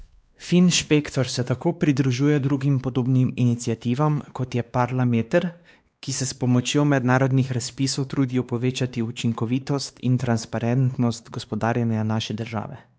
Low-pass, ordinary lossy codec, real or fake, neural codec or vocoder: none; none; fake; codec, 16 kHz, 2 kbps, X-Codec, WavLM features, trained on Multilingual LibriSpeech